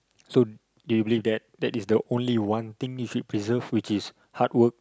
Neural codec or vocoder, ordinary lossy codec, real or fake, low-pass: none; none; real; none